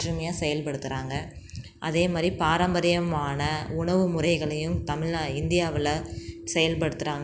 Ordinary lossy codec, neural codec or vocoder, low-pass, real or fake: none; none; none; real